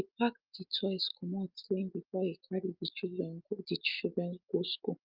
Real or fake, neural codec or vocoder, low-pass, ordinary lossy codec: real; none; 5.4 kHz; Opus, 24 kbps